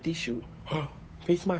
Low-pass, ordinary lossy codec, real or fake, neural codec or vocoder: none; none; fake; codec, 16 kHz, 8 kbps, FunCodec, trained on Chinese and English, 25 frames a second